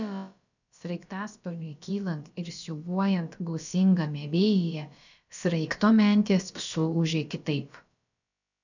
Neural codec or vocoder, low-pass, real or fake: codec, 16 kHz, about 1 kbps, DyCAST, with the encoder's durations; 7.2 kHz; fake